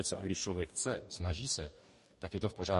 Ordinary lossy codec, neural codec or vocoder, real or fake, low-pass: MP3, 48 kbps; codec, 44.1 kHz, 2.6 kbps, DAC; fake; 14.4 kHz